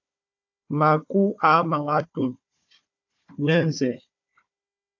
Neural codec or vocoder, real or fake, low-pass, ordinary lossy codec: codec, 16 kHz, 4 kbps, FunCodec, trained on Chinese and English, 50 frames a second; fake; 7.2 kHz; AAC, 48 kbps